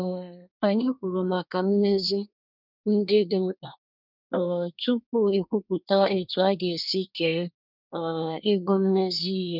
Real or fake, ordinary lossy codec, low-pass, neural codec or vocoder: fake; none; 5.4 kHz; codec, 24 kHz, 1 kbps, SNAC